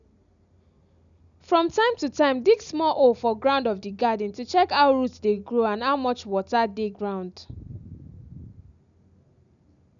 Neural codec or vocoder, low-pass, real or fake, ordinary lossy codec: none; 7.2 kHz; real; none